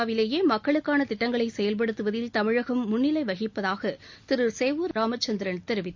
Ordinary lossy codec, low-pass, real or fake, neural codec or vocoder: MP3, 64 kbps; 7.2 kHz; real; none